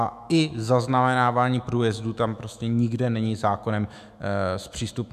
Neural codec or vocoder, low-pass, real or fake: autoencoder, 48 kHz, 128 numbers a frame, DAC-VAE, trained on Japanese speech; 14.4 kHz; fake